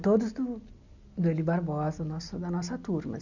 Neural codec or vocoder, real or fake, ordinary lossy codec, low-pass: none; real; none; 7.2 kHz